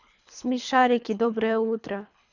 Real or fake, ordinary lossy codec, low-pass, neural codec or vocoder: fake; none; 7.2 kHz; codec, 24 kHz, 3 kbps, HILCodec